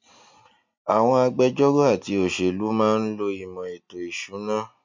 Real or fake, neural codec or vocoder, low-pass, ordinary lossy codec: real; none; 7.2 kHz; MP3, 48 kbps